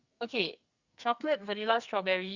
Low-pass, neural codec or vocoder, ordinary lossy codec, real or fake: 7.2 kHz; codec, 44.1 kHz, 2.6 kbps, SNAC; Opus, 64 kbps; fake